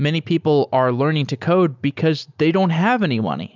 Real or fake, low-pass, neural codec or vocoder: real; 7.2 kHz; none